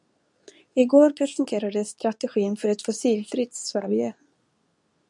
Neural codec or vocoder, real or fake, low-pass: codec, 24 kHz, 0.9 kbps, WavTokenizer, medium speech release version 2; fake; 10.8 kHz